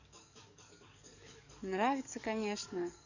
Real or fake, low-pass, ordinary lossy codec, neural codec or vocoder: fake; 7.2 kHz; none; codec, 44.1 kHz, 7.8 kbps, DAC